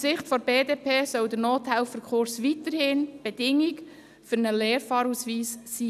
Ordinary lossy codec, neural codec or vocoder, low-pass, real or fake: none; none; 14.4 kHz; real